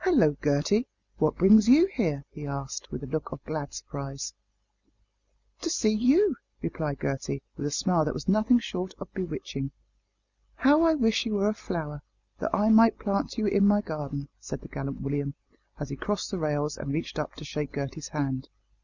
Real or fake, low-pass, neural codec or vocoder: real; 7.2 kHz; none